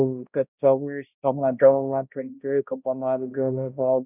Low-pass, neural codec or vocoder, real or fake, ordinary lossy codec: 3.6 kHz; codec, 16 kHz, 0.5 kbps, X-Codec, HuBERT features, trained on balanced general audio; fake; none